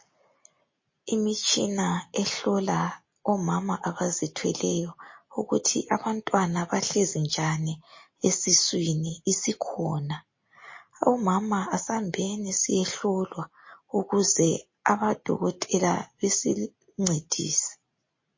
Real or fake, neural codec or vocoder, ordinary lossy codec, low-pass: real; none; MP3, 32 kbps; 7.2 kHz